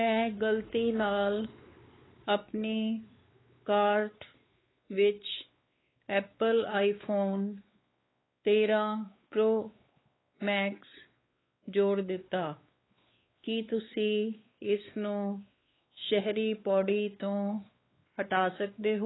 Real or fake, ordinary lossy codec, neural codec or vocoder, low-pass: fake; AAC, 16 kbps; codec, 16 kHz, 4 kbps, X-Codec, WavLM features, trained on Multilingual LibriSpeech; 7.2 kHz